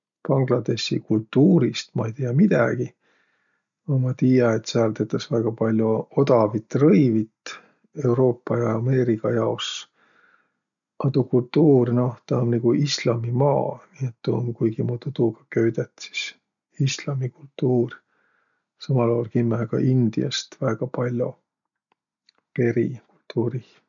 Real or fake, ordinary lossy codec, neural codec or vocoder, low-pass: real; none; none; 7.2 kHz